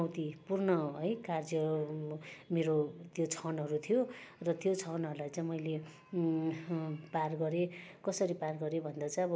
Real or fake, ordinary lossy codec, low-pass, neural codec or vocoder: real; none; none; none